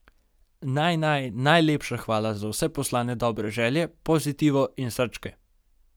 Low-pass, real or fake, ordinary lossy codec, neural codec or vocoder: none; real; none; none